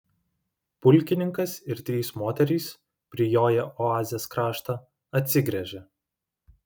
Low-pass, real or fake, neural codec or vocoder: 19.8 kHz; real; none